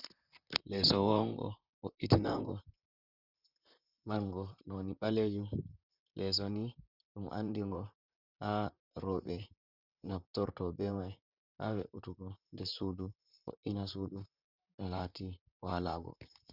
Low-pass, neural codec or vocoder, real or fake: 5.4 kHz; vocoder, 44.1 kHz, 128 mel bands, Pupu-Vocoder; fake